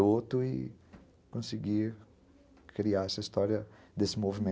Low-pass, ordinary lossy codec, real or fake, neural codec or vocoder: none; none; real; none